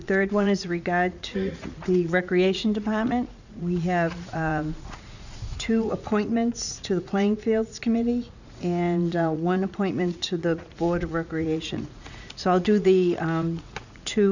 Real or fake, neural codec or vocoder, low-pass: fake; vocoder, 44.1 kHz, 80 mel bands, Vocos; 7.2 kHz